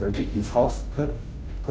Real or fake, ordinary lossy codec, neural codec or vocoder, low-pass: fake; none; codec, 16 kHz, 0.5 kbps, FunCodec, trained on Chinese and English, 25 frames a second; none